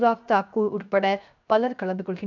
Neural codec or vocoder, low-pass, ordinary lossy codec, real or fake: codec, 16 kHz, 0.7 kbps, FocalCodec; 7.2 kHz; none; fake